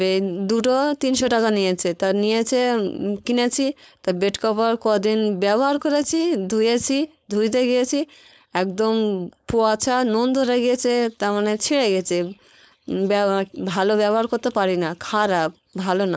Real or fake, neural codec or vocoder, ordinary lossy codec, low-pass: fake; codec, 16 kHz, 4.8 kbps, FACodec; none; none